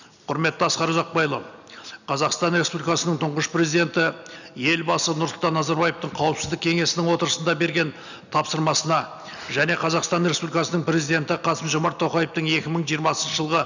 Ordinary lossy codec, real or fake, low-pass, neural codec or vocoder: none; real; 7.2 kHz; none